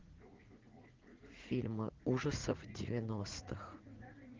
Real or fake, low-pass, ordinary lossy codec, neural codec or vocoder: fake; 7.2 kHz; Opus, 16 kbps; vocoder, 44.1 kHz, 80 mel bands, Vocos